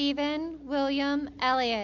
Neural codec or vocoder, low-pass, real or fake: none; 7.2 kHz; real